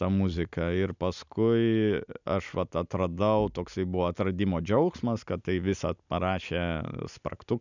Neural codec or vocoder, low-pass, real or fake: none; 7.2 kHz; real